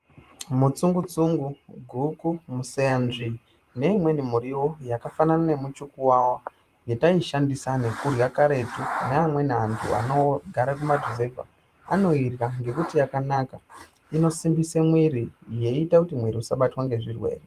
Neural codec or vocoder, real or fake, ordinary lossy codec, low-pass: vocoder, 44.1 kHz, 128 mel bands every 512 samples, BigVGAN v2; fake; Opus, 32 kbps; 14.4 kHz